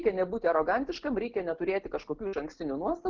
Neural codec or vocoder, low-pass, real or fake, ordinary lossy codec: none; 7.2 kHz; real; Opus, 16 kbps